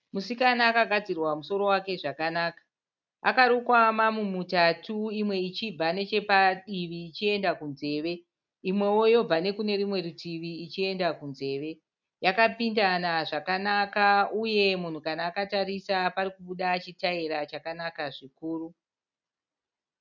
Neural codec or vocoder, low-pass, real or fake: none; 7.2 kHz; real